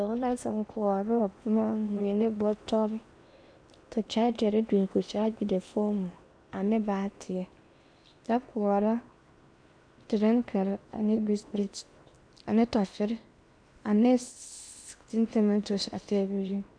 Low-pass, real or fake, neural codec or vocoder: 9.9 kHz; fake; codec, 16 kHz in and 24 kHz out, 0.8 kbps, FocalCodec, streaming, 65536 codes